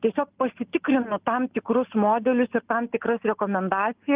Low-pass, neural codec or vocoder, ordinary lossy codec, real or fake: 3.6 kHz; none; Opus, 32 kbps; real